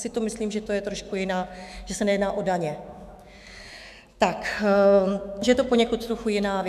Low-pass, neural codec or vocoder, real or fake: 14.4 kHz; autoencoder, 48 kHz, 128 numbers a frame, DAC-VAE, trained on Japanese speech; fake